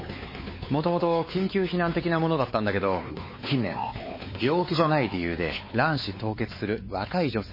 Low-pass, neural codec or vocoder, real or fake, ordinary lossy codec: 5.4 kHz; codec, 16 kHz, 4 kbps, X-Codec, WavLM features, trained on Multilingual LibriSpeech; fake; MP3, 24 kbps